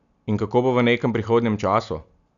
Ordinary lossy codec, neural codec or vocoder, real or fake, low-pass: none; none; real; 7.2 kHz